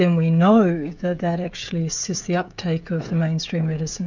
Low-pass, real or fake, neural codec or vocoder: 7.2 kHz; fake; codec, 16 kHz, 16 kbps, FreqCodec, smaller model